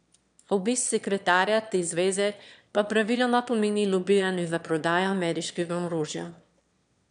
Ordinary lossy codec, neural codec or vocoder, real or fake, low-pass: none; autoencoder, 22.05 kHz, a latent of 192 numbers a frame, VITS, trained on one speaker; fake; 9.9 kHz